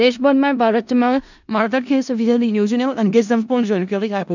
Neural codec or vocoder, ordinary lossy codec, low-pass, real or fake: codec, 16 kHz in and 24 kHz out, 0.4 kbps, LongCat-Audio-Codec, four codebook decoder; none; 7.2 kHz; fake